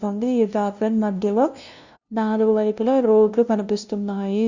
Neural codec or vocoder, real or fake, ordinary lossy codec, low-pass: codec, 16 kHz, 0.5 kbps, FunCodec, trained on LibriTTS, 25 frames a second; fake; Opus, 64 kbps; 7.2 kHz